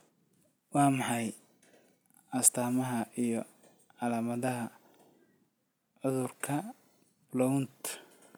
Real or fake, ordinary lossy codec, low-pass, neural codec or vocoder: real; none; none; none